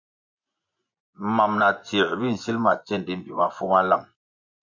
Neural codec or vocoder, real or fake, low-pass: vocoder, 24 kHz, 100 mel bands, Vocos; fake; 7.2 kHz